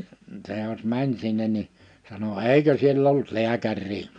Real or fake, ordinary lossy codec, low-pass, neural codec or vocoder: real; MP3, 96 kbps; 9.9 kHz; none